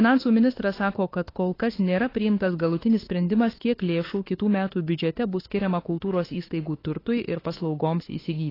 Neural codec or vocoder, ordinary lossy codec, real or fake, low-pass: autoencoder, 48 kHz, 32 numbers a frame, DAC-VAE, trained on Japanese speech; AAC, 24 kbps; fake; 5.4 kHz